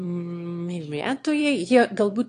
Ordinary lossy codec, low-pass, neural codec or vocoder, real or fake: AAC, 48 kbps; 9.9 kHz; autoencoder, 22.05 kHz, a latent of 192 numbers a frame, VITS, trained on one speaker; fake